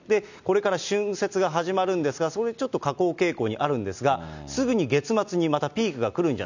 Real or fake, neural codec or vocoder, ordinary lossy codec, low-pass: real; none; none; 7.2 kHz